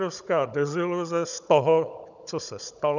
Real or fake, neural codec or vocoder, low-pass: fake; codec, 16 kHz, 16 kbps, FunCodec, trained on Chinese and English, 50 frames a second; 7.2 kHz